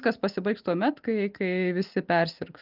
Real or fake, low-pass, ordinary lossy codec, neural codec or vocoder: real; 5.4 kHz; Opus, 32 kbps; none